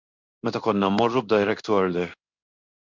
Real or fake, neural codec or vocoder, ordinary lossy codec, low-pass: fake; codec, 16 kHz in and 24 kHz out, 1 kbps, XY-Tokenizer; MP3, 64 kbps; 7.2 kHz